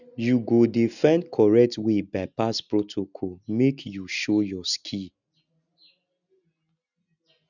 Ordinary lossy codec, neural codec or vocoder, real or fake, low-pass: none; none; real; 7.2 kHz